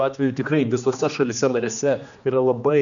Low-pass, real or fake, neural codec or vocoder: 7.2 kHz; fake; codec, 16 kHz, 2 kbps, X-Codec, HuBERT features, trained on general audio